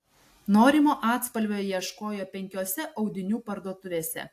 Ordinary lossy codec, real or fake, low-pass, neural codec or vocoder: AAC, 64 kbps; real; 14.4 kHz; none